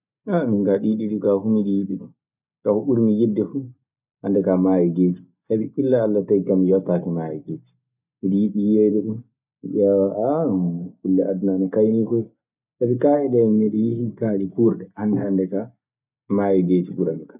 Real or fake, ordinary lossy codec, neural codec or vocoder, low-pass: real; none; none; 3.6 kHz